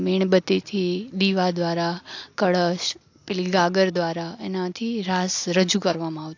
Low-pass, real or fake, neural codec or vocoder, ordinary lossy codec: 7.2 kHz; real; none; AAC, 48 kbps